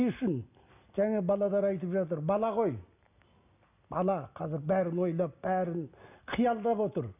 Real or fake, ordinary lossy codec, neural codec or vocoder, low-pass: real; none; none; 3.6 kHz